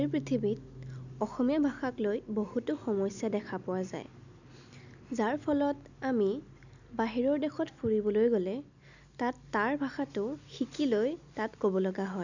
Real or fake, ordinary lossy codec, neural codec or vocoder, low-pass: real; none; none; 7.2 kHz